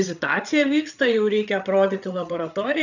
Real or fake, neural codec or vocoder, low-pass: fake; codec, 16 kHz, 8 kbps, FreqCodec, larger model; 7.2 kHz